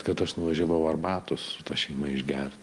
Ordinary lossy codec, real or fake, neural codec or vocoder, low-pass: Opus, 24 kbps; real; none; 10.8 kHz